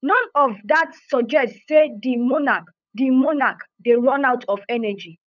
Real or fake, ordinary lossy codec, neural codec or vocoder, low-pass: fake; none; codec, 16 kHz, 8 kbps, FunCodec, trained on LibriTTS, 25 frames a second; 7.2 kHz